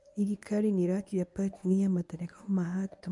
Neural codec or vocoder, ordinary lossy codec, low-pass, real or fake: codec, 24 kHz, 0.9 kbps, WavTokenizer, medium speech release version 1; none; 10.8 kHz; fake